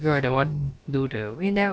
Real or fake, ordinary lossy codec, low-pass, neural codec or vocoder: fake; none; none; codec, 16 kHz, about 1 kbps, DyCAST, with the encoder's durations